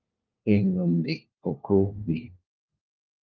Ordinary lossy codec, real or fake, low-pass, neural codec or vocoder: Opus, 24 kbps; fake; 7.2 kHz; codec, 16 kHz, 1 kbps, FunCodec, trained on LibriTTS, 50 frames a second